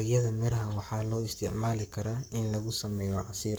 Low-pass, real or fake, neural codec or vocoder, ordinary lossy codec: none; fake; codec, 44.1 kHz, 7.8 kbps, Pupu-Codec; none